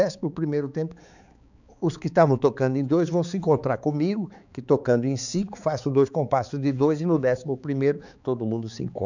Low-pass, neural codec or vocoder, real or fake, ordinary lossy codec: 7.2 kHz; codec, 16 kHz, 4 kbps, X-Codec, HuBERT features, trained on balanced general audio; fake; none